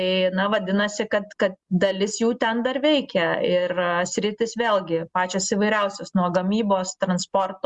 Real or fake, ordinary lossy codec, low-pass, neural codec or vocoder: real; Opus, 64 kbps; 10.8 kHz; none